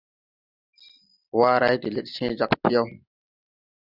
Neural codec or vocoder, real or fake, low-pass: none; real; 5.4 kHz